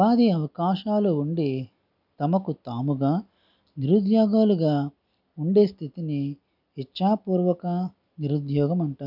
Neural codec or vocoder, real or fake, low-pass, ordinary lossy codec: none; real; 5.4 kHz; none